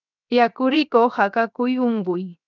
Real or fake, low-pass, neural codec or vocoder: fake; 7.2 kHz; codec, 16 kHz, 0.7 kbps, FocalCodec